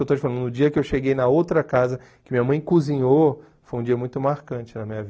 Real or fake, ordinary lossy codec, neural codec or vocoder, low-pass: real; none; none; none